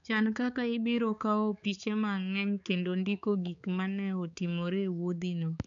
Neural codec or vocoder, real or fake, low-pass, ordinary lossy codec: codec, 16 kHz, 4 kbps, X-Codec, HuBERT features, trained on balanced general audio; fake; 7.2 kHz; none